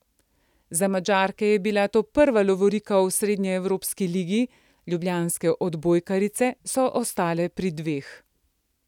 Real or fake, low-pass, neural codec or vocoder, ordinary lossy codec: real; 19.8 kHz; none; none